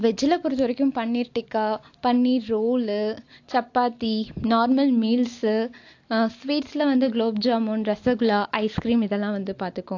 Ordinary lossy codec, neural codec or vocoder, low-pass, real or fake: AAC, 48 kbps; none; 7.2 kHz; real